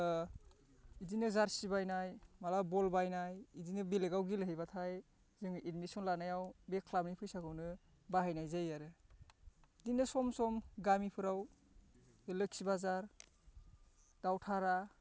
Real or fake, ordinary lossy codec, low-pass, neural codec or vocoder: real; none; none; none